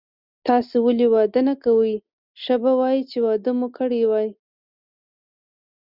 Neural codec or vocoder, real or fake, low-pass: none; real; 5.4 kHz